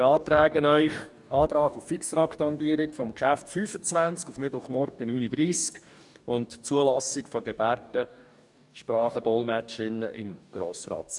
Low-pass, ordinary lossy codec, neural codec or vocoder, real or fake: 10.8 kHz; none; codec, 44.1 kHz, 2.6 kbps, DAC; fake